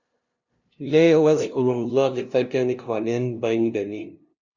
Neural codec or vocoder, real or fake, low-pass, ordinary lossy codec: codec, 16 kHz, 0.5 kbps, FunCodec, trained on LibriTTS, 25 frames a second; fake; 7.2 kHz; Opus, 64 kbps